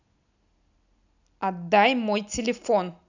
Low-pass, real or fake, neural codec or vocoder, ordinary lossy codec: 7.2 kHz; real; none; none